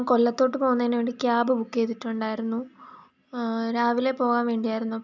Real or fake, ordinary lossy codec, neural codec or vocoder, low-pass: real; none; none; 7.2 kHz